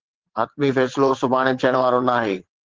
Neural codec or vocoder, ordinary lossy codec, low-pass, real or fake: vocoder, 22.05 kHz, 80 mel bands, WaveNeXt; Opus, 16 kbps; 7.2 kHz; fake